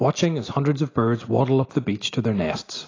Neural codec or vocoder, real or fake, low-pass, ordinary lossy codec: none; real; 7.2 kHz; AAC, 32 kbps